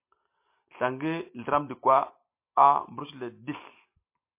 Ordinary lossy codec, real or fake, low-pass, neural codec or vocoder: MP3, 24 kbps; real; 3.6 kHz; none